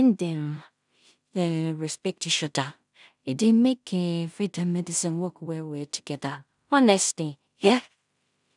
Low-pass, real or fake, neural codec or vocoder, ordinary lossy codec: 10.8 kHz; fake; codec, 16 kHz in and 24 kHz out, 0.4 kbps, LongCat-Audio-Codec, two codebook decoder; none